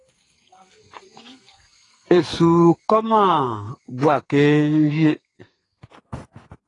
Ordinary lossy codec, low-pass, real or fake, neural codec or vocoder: AAC, 32 kbps; 10.8 kHz; fake; codec, 44.1 kHz, 2.6 kbps, SNAC